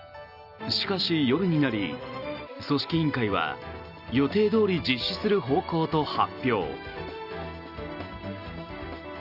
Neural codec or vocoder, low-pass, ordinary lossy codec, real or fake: none; 5.4 kHz; Opus, 64 kbps; real